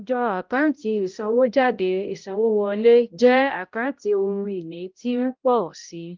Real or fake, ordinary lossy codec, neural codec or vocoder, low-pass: fake; Opus, 24 kbps; codec, 16 kHz, 0.5 kbps, X-Codec, HuBERT features, trained on balanced general audio; 7.2 kHz